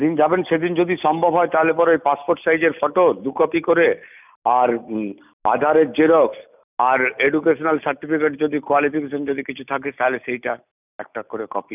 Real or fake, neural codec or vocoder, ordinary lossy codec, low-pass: real; none; none; 3.6 kHz